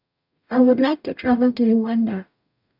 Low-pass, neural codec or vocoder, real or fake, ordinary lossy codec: 5.4 kHz; codec, 44.1 kHz, 0.9 kbps, DAC; fake; none